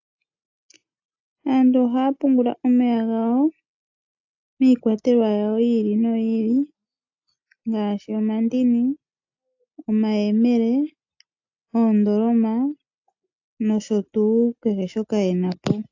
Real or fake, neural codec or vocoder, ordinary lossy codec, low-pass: real; none; AAC, 48 kbps; 7.2 kHz